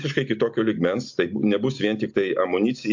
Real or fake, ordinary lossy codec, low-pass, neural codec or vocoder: real; MP3, 48 kbps; 7.2 kHz; none